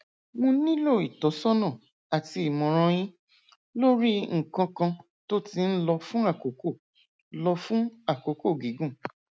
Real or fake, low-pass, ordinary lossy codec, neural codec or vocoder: real; none; none; none